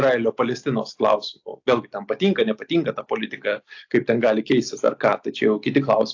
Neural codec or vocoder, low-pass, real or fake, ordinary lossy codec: none; 7.2 kHz; real; AAC, 48 kbps